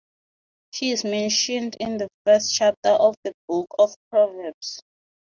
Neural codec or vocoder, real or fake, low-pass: none; real; 7.2 kHz